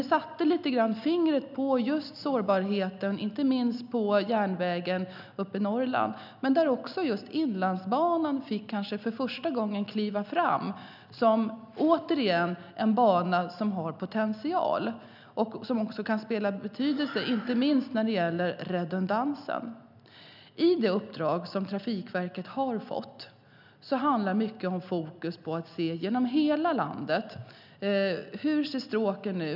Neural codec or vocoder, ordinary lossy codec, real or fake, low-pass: none; none; real; 5.4 kHz